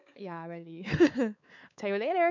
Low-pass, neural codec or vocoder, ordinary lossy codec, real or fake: 7.2 kHz; codec, 16 kHz, 4 kbps, X-Codec, WavLM features, trained on Multilingual LibriSpeech; none; fake